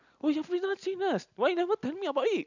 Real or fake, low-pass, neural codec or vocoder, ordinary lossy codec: real; 7.2 kHz; none; none